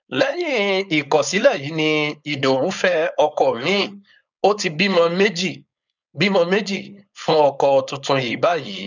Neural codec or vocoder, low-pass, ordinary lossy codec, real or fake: codec, 16 kHz, 4.8 kbps, FACodec; 7.2 kHz; none; fake